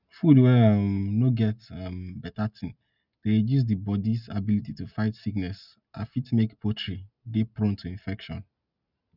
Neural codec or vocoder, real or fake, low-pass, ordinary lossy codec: none; real; 5.4 kHz; none